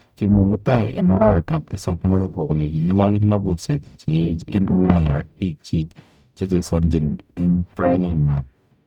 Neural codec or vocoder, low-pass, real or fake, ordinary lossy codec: codec, 44.1 kHz, 0.9 kbps, DAC; 19.8 kHz; fake; none